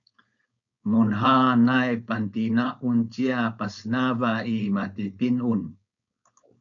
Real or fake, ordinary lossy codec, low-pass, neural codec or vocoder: fake; MP3, 96 kbps; 7.2 kHz; codec, 16 kHz, 4.8 kbps, FACodec